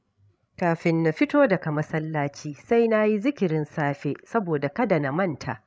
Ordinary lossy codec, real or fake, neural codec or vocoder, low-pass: none; fake; codec, 16 kHz, 16 kbps, FreqCodec, larger model; none